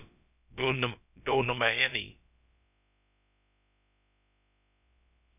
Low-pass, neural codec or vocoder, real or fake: 3.6 kHz; codec, 16 kHz, about 1 kbps, DyCAST, with the encoder's durations; fake